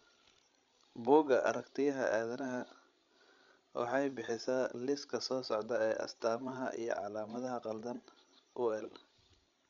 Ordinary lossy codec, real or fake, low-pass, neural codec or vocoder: MP3, 64 kbps; fake; 7.2 kHz; codec, 16 kHz, 16 kbps, FreqCodec, larger model